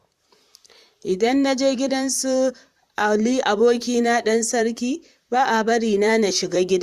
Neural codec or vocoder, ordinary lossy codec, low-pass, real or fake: vocoder, 44.1 kHz, 128 mel bands, Pupu-Vocoder; Opus, 64 kbps; 14.4 kHz; fake